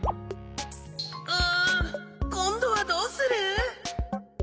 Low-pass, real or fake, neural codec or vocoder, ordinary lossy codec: none; real; none; none